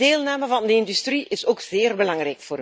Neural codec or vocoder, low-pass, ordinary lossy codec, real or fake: none; none; none; real